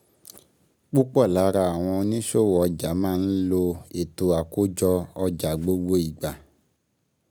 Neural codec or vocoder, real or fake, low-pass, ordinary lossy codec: none; real; none; none